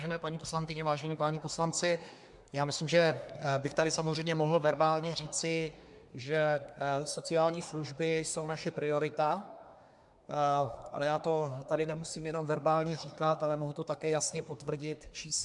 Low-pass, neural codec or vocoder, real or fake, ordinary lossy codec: 10.8 kHz; codec, 24 kHz, 1 kbps, SNAC; fake; MP3, 96 kbps